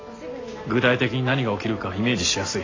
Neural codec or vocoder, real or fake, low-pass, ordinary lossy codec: none; real; 7.2 kHz; AAC, 32 kbps